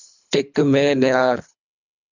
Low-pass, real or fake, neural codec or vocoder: 7.2 kHz; fake; codec, 24 kHz, 3 kbps, HILCodec